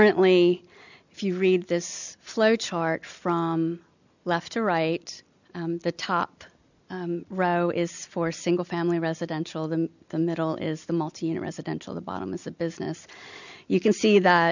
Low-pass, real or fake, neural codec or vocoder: 7.2 kHz; real; none